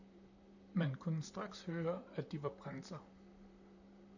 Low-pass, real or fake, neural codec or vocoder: 7.2 kHz; fake; vocoder, 44.1 kHz, 128 mel bands, Pupu-Vocoder